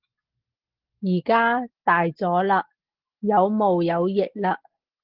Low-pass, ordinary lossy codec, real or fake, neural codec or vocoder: 5.4 kHz; Opus, 16 kbps; real; none